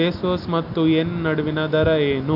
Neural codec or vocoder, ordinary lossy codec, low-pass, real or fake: none; AAC, 48 kbps; 5.4 kHz; real